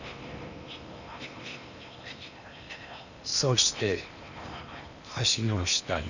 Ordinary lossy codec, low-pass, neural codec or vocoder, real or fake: none; 7.2 kHz; codec, 16 kHz in and 24 kHz out, 0.8 kbps, FocalCodec, streaming, 65536 codes; fake